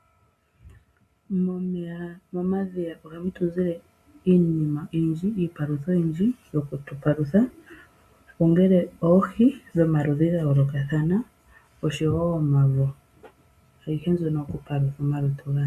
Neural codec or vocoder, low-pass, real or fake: none; 14.4 kHz; real